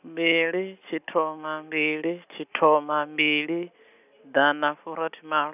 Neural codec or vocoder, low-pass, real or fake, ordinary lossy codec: none; 3.6 kHz; real; none